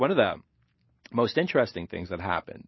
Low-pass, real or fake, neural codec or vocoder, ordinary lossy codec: 7.2 kHz; real; none; MP3, 24 kbps